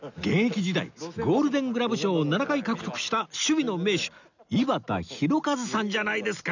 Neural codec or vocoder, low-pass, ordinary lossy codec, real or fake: none; 7.2 kHz; none; real